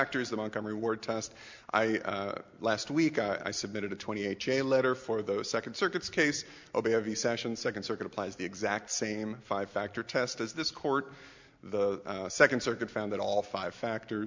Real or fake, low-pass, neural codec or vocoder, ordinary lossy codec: real; 7.2 kHz; none; MP3, 64 kbps